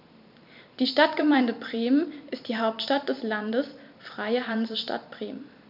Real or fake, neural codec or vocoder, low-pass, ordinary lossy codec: fake; vocoder, 44.1 kHz, 128 mel bands every 256 samples, BigVGAN v2; 5.4 kHz; none